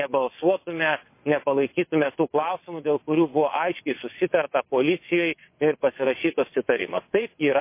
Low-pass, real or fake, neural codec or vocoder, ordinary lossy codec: 3.6 kHz; fake; vocoder, 22.05 kHz, 80 mel bands, WaveNeXt; MP3, 24 kbps